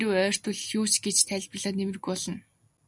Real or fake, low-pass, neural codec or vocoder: real; 10.8 kHz; none